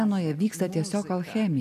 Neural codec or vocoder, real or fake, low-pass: none; real; 14.4 kHz